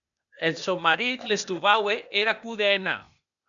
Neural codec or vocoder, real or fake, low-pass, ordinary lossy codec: codec, 16 kHz, 0.8 kbps, ZipCodec; fake; 7.2 kHz; MP3, 96 kbps